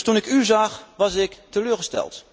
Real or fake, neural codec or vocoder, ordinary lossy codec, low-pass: real; none; none; none